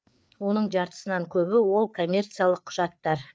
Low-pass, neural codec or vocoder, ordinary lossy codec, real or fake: none; codec, 16 kHz, 4 kbps, FreqCodec, larger model; none; fake